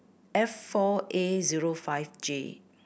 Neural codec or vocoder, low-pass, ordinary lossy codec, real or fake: none; none; none; real